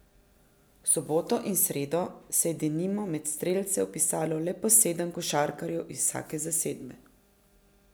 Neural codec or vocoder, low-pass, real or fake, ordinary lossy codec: none; none; real; none